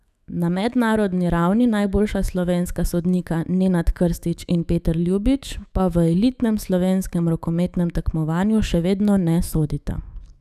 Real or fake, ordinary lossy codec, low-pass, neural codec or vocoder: fake; none; 14.4 kHz; autoencoder, 48 kHz, 128 numbers a frame, DAC-VAE, trained on Japanese speech